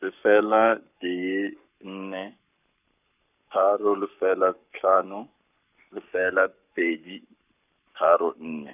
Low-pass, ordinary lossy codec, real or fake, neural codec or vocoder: 3.6 kHz; none; fake; codec, 44.1 kHz, 7.8 kbps, Pupu-Codec